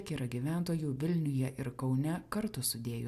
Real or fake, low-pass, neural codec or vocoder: real; 14.4 kHz; none